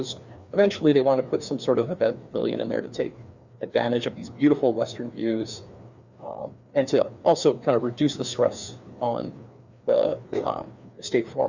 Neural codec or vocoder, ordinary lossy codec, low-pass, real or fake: codec, 16 kHz, 2 kbps, FreqCodec, larger model; Opus, 64 kbps; 7.2 kHz; fake